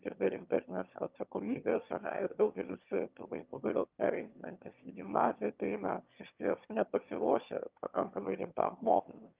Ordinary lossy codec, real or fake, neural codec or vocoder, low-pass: Opus, 24 kbps; fake; autoencoder, 22.05 kHz, a latent of 192 numbers a frame, VITS, trained on one speaker; 3.6 kHz